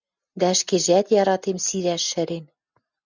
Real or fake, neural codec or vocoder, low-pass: real; none; 7.2 kHz